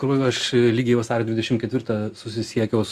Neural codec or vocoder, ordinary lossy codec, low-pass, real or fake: none; Opus, 64 kbps; 14.4 kHz; real